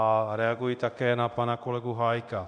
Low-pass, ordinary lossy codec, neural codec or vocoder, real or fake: 9.9 kHz; MP3, 96 kbps; codec, 24 kHz, 0.9 kbps, DualCodec; fake